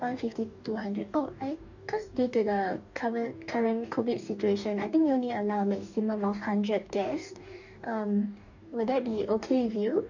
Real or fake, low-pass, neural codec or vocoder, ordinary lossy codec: fake; 7.2 kHz; codec, 44.1 kHz, 2.6 kbps, DAC; none